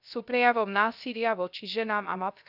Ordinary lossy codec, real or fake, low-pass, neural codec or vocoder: none; fake; 5.4 kHz; codec, 16 kHz, 0.3 kbps, FocalCodec